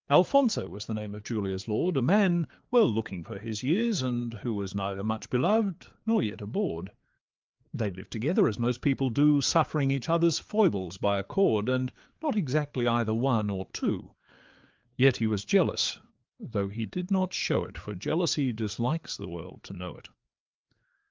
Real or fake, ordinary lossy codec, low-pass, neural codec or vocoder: fake; Opus, 24 kbps; 7.2 kHz; codec, 16 kHz, 4 kbps, FreqCodec, larger model